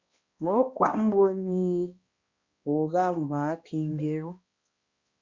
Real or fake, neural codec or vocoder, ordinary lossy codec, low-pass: fake; codec, 16 kHz, 1 kbps, X-Codec, HuBERT features, trained on balanced general audio; Opus, 64 kbps; 7.2 kHz